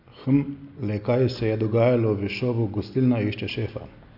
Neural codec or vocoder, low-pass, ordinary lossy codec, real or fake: none; 5.4 kHz; none; real